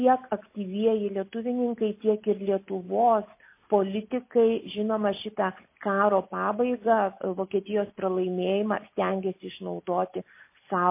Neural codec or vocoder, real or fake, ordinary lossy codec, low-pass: none; real; MP3, 24 kbps; 3.6 kHz